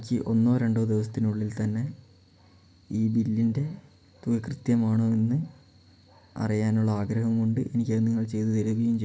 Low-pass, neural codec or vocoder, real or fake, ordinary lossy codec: none; none; real; none